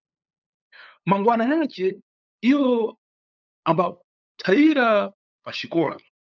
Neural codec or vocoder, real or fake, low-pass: codec, 16 kHz, 8 kbps, FunCodec, trained on LibriTTS, 25 frames a second; fake; 7.2 kHz